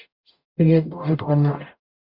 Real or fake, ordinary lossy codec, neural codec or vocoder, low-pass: fake; Opus, 64 kbps; codec, 44.1 kHz, 0.9 kbps, DAC; 5.4 kHz